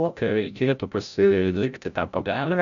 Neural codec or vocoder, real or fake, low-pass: codec, 16 kHz, 0.5 kbps, FreqCodec, larger model; fake; 7.2 kHz